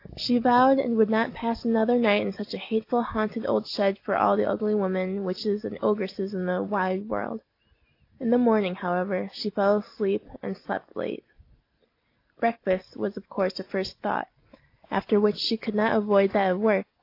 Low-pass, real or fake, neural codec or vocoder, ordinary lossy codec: 5.4 kHz; real; none; AAC, 32 kbps